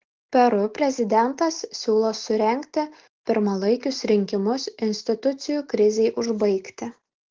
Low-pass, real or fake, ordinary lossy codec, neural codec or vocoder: 7.2 kHz; real; Opus, 16 kbps; none